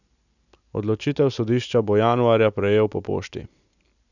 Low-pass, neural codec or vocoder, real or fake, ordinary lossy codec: 7.2 kHz; none; real; none